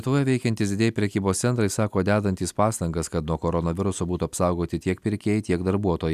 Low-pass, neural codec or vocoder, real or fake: 14.4 kHz; none; real